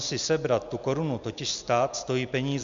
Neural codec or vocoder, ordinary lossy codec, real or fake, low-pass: none; MP3, 64 kbps; real; 7.2 kHz